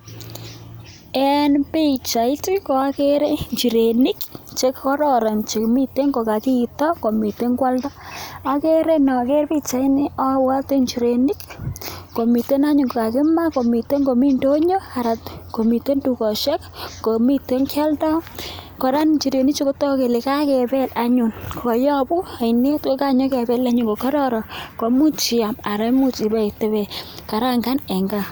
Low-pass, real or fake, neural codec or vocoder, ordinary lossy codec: none; real; none; none